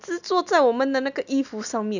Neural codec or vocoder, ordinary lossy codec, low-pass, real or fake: none; none; 7.2 kHz; real